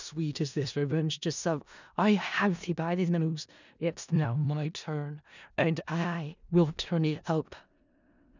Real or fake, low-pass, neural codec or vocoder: fake; 7.2 kHz; codec, 16 kHz in and 24 kHz out, 0.4 kbps, LongCat-Audio-Codec, four codebook decoder